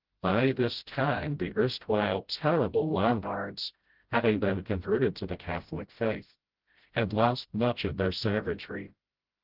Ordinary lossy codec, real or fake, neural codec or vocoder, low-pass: Opus, 16 kbps; fake; codec, 16 kHz, 0.5 kbps, FreqCodec, smaller model; 5.4 kHz